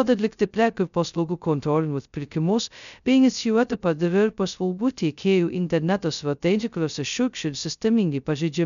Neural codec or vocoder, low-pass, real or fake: codec, 16 kHz, 0.2 kbps, FocalCodec; 7.2 kHz; fake